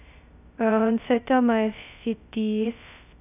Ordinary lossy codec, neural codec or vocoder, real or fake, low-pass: none; codec, 16 kHz, 0.2 kbps, FocalCodec; fake; 3.6 kHz